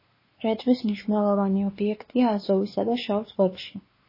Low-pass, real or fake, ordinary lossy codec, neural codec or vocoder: 5.4 kHz; fake; MP3, 24 kbps; codec, 16 kHz, 2 kbps, X-Codec, WavLM features, trained on Multilingual LibriSpeech